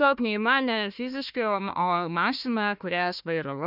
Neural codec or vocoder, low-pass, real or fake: codec, 16 kHz, 1 kbps, FunCodec, trained on Chinese and English, 50 frames a second; 5.4 kHz; fake